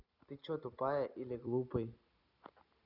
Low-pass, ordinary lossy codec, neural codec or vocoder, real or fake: 5.4 kHz; none; none; real